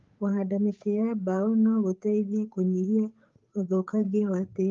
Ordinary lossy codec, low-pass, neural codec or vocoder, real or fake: Opus, 32 kbps; 7.2 kHz; codec, 16 kHz, 8 kbps, FunCodec, trained on Chinese and English, 25 frames a second; fake